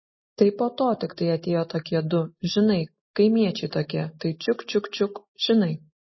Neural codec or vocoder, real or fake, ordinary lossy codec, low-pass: none; real; MP3, 24 kbps; 7.2 kHz